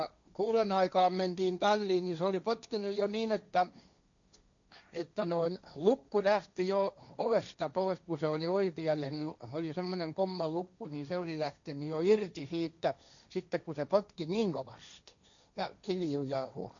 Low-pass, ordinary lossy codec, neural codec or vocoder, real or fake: 7.2 kHz; none; codec, 16 kHz, 1.1 kbps, Voila-Tokenizer; fake